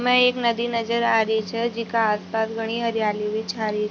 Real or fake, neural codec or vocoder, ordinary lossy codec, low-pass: real; none; none; none